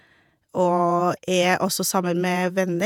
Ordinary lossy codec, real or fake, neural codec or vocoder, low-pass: none; fake; vocoder, 48 kHz, 128 mel bands, Vocos; 19.8 kHz